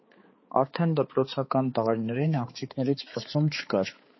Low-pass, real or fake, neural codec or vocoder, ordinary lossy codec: 7.2 kHz; fake; codec, 16 kHz, 4 kbps, X-Codec, HuBERT features, trained on balanced general audio; MP3, 24 kbps